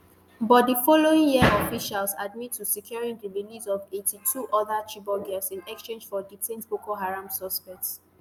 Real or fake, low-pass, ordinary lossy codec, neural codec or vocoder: real; none; none; none